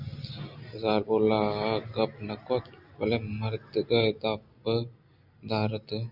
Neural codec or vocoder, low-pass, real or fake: none; 5.4 kHz; real